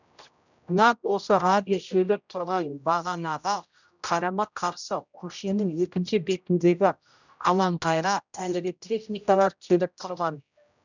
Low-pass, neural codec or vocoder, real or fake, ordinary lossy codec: 7.2 kHz; codec, 16 kHz, 0.5 kbps, X-Codec, HuBERT features, trained on general audio; fake; none